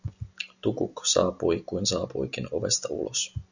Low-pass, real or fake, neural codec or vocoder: 7.2 kHz; real; none